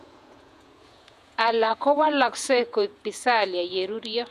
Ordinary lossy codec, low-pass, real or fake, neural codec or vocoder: none; 14.4 kHz; fake; vocoder, 48 kHz, 128 mel bands, Vocos